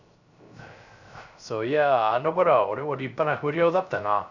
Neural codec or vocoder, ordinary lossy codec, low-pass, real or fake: codec, 16 kHz, 0.3 kbps, FocalCodec; none; 7.2 kHz; fake